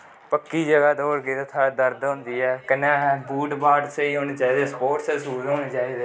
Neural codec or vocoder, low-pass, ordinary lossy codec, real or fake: none; none; none; real